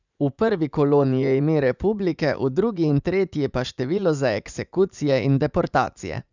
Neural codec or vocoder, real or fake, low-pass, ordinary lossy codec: vocoder, 44.1 kHz, 80 mel bands, Vocos; fake; 7.2 kHz; none